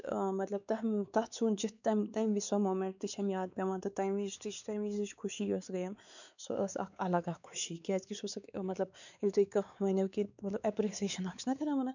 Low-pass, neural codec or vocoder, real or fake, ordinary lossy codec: 7.2 kHz; codec, 16 kHz, 4 kbps, X-Codec, WavLM features, trained on Multilingual LibriSpeech; fake; none